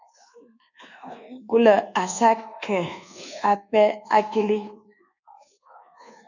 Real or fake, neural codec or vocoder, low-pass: fake; codec, 24 kHz, 1.2 kbps, DualCodec; 7.2 kHz